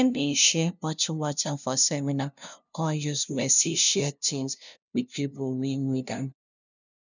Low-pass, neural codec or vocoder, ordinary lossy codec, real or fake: 7.2 kHz; codec, 16 kHz, 0.5 kbps, FunCodec, trained on LibriTTS, 25 frames a second; none; fake